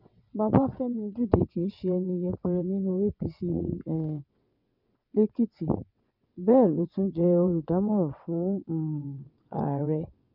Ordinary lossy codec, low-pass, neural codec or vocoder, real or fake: none; 5.4 kHz; vocoder, 22.05 kHz, 80 mel bands, WaveNeXt; fake